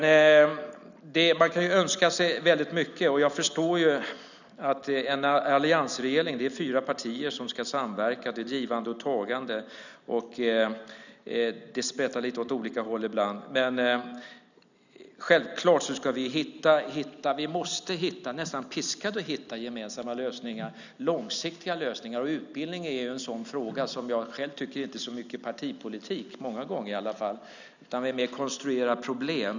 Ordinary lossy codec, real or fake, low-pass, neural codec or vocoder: none; real; 7.2 kHz; none